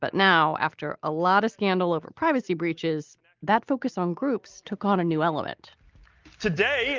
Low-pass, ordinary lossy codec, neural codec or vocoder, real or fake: 7.2 kHz; Opus, 24 kbps; none; real